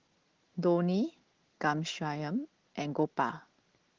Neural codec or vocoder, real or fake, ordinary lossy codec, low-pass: none; real; Opus, 16 kbps; 7.2 kHz